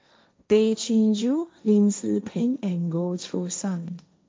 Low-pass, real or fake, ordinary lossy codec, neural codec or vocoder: none; fake; none; codec, 16 kHz, 1.1 kbps, Voila-Tokenizer